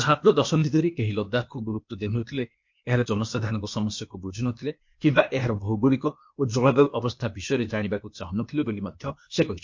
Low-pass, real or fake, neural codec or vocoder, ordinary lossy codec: 7.2 kHz; fake; codec, 16 kHz, 0.8 kbps, ZipCodec; MP3, 48 kbps